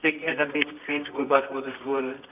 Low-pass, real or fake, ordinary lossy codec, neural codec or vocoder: 3.6 kHz; fake; none; codec, 24 kHz, 0.9 kbps, WavTokenizer, medium music audio release